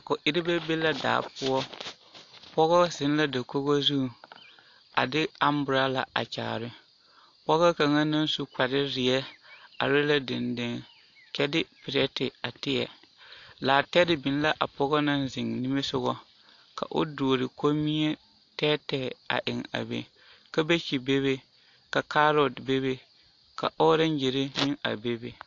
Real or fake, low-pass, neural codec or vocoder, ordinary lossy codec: real; 7.2 kHz; none; AAC, 48 kbps